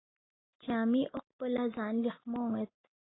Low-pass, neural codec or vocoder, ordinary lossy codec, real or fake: 7.2 kHz; none; AAC, 16 kbps; real